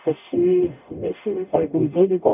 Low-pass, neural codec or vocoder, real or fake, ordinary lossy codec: 3.6 kHz; codec, 44.1 kHz, 0.9 kbps, DAC; fake; none